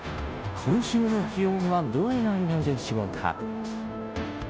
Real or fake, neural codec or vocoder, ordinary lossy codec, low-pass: fake; codec, 16 kHz, 0.5 kbps, FunCodec, trained on Chinese and English, 25 frames a second; none; none